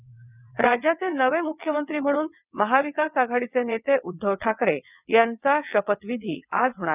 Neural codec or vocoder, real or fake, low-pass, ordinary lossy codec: vocoder, 22.05 kHz, 80 mel bands, WaveNeXt; fake; 3.6 kHz; none